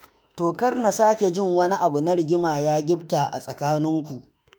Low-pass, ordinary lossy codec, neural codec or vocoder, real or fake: none; none; autoencoder, 48 kHz, 32 numbers a frame, DAC-VAE, trained on Japanese speech; fake